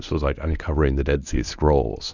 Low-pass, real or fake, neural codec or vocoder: 7.2 kHz; fake; codec, 24 kHz, 0.9 kbps, WavTokenizer, medium speech release version 1